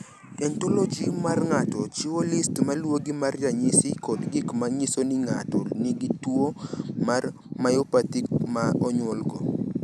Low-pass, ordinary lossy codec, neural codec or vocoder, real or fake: none; none; none; real